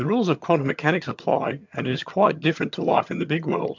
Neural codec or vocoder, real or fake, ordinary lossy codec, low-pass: vocoder, 22.05 kHz, 80 mel bands, HiFi-GAN; fake; MP3, 64 kbps; 7.2 kHz